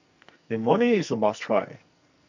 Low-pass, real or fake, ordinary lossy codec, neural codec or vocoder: 7.2 kHz; fake; none; codec, 44.1 kHz, 2.6 kbps, SNAC